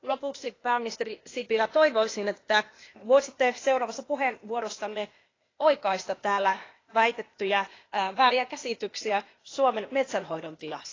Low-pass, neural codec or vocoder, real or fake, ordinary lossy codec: 7.2 kHz; codec, 16 kHz, 0.8 kbps, ZipCodec; fake; AAC, 32 kbps